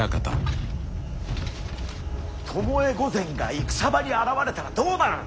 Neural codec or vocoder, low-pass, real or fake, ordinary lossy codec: none; none; real; none